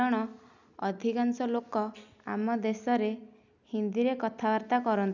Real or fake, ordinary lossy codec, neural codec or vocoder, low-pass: real; none; none; 7.2 kHz